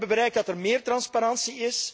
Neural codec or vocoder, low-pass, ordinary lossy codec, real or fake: none; none; none; real